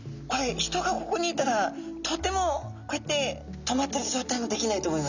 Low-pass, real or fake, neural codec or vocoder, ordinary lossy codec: 7.2 kHz; real; none; none